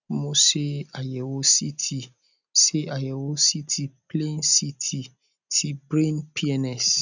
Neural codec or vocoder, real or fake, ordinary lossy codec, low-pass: none; real; none; 7.2 kHz